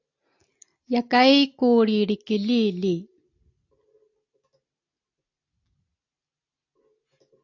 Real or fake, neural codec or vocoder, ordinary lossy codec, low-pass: real; none; AAC, 48 kbps; 7.2 kHz